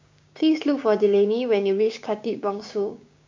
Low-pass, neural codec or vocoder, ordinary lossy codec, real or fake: 7.2 kHz; codec, 16 kHz, 6 kbps, DAC; MP3, 64 kbps; fake